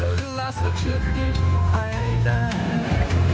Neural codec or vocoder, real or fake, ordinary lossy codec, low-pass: codec, 16 kHz, 0.9 kbps, LongCat-Audio-Codec; fake; none; none